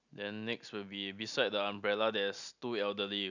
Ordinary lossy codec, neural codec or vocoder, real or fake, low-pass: none; none; real; 7.2 kHz